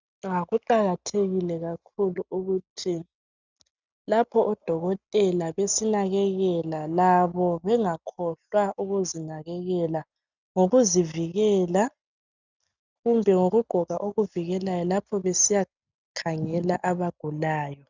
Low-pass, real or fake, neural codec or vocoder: 7.2 kHz; real; none